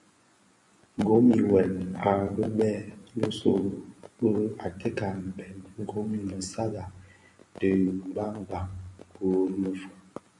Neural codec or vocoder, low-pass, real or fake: vocoder, 24 kHz, 100 mel bands, Vocos; 10.8 kHz; fake